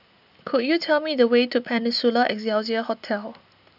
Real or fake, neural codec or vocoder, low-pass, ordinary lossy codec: real; none; 5.4 kHz; none